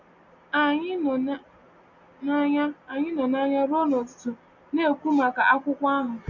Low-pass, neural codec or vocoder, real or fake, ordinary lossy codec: 7.2 kHz; none; real; none